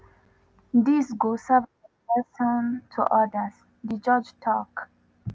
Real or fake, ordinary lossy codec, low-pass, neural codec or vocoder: real; none; none; none